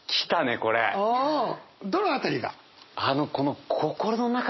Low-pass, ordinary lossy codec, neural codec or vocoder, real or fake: 7.2 kHz; MP3, 24 kbps; none; real